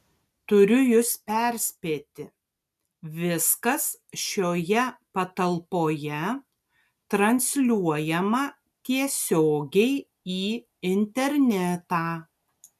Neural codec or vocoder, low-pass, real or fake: none; 14.4 kHz; real